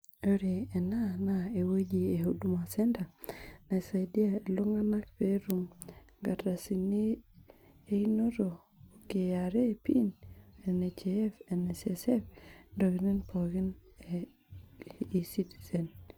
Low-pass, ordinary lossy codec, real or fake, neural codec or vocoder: none; none; real; none